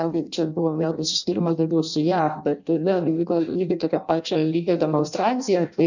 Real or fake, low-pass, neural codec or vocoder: fake; 7.2 kHz; codec, 16 kHz in and 24 kHz out, 0.6 kbps, FireRedTTS-2 codec